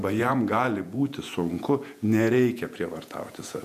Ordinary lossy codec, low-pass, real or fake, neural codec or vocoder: MP3, 96 kbps; 14.4 kHz; fake; vocoder, 48 kHz, 128 mel bands, Vocos